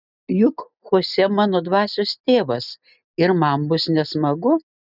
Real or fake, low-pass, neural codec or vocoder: real; 5.4 kHz; none